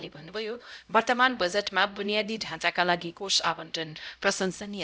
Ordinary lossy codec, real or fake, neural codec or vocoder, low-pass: none; fake; codec, 16 kHz, 0.5 kbps, X-Codec, HuBERT features, trained on LibriSpeech; none